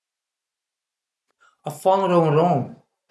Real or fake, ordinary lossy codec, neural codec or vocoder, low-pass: real; none; none; none